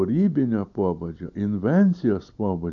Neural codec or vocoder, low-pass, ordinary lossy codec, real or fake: none; 7.2 kHz; AAC, 64 kbps; real